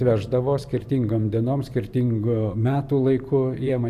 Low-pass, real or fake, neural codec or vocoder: 14.4 kHz; fake; vocoder, 44.1 kHz, 128 mel bands every 512 samples, BigVGAN v2